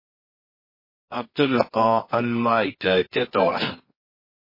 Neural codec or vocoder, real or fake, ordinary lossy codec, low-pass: codec, 24 kHz, 0.9 kbps, WavTokenizer, medium music audio release; fake; MP3, 24 kbps; 5.4 kHz